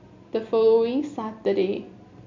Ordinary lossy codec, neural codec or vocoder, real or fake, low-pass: MP3, 48 kbps; none; real; 7.2 kHz